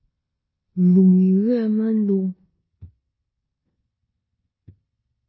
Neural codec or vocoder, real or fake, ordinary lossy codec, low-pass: codec, 16 kHz in and 24 kHz out, 0.9 kbps, LongCat-Audio-Codec, four codebook decoder; fake; MP3, 24 kbps; 7.2 kHz